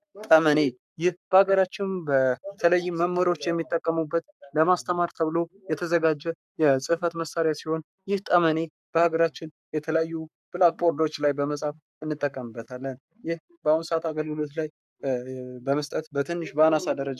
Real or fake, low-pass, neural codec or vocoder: fake; 14.4 kHz; codec, 44.1 kHz, 7.8 kbps, Pupu-Codec